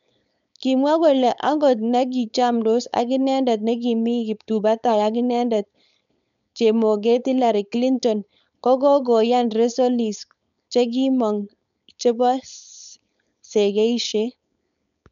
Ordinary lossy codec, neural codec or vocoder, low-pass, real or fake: none; codec, 16 kHz, 4.8 kbps, FACodec; 7.2 kHz; fake